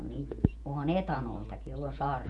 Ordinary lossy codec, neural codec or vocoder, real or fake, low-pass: none; vocoder, 48 kHz, 128 mel bands, Vocos; fake; 10.8 kHz